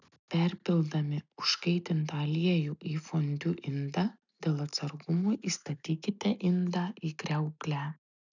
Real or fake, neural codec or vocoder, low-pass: real; none; 7.2 kHz